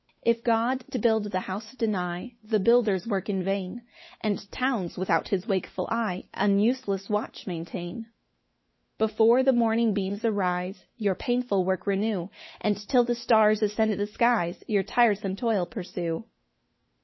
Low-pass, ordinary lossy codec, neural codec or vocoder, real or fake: 7.2 kHz; MP3, 24 kbps; autoencoder, 48 kHz, 128 numbers a frame, DAC-VAE, trained on Japanese speech; fake